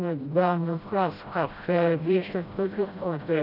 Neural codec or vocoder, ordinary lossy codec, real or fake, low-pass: codec, 16 kHz, 0.5 kbps, FreqCodec, smaller model; none; fake; 5.4 kHz